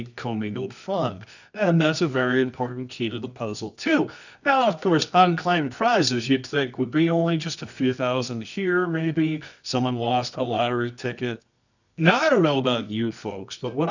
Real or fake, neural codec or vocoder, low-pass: fake; codec, 24 kHz, 0.9 kbps, WavTokenizer, medium music audio release; 7.2 kHz